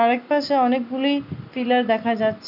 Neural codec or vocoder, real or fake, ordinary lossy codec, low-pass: none; real; none; 5.4 kHz